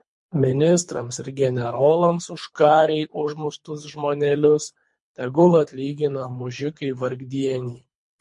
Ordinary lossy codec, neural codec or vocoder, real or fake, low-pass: MP3, 48 kbps; codec, 24 kHz, 3 kbps, HILCodec; fake; 10.8 kHz